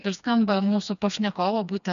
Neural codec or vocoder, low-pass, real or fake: codec, 16 kHz, 2 kbps, FreqCodec, smaller model; 7.2 kHz; fake